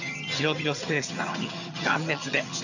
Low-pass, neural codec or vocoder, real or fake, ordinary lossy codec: 7.2 kHz; vocoder, 22.05 kHz, 80 mel bands, HiFi-GAN; fake; none